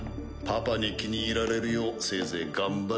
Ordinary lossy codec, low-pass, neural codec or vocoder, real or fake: none; none; none; real